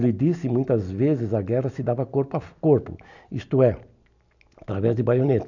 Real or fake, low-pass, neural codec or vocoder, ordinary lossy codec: real; 7.2 kHz; none; none